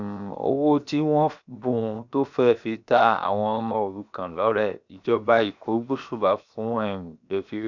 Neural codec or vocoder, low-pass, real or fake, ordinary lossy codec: codec, 16 kHz, 0.7 kbps, FocalCodec; 7.2 kHz; fake; none